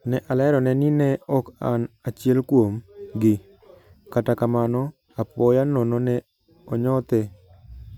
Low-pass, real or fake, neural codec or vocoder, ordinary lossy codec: 19.8 kHz; real; none; none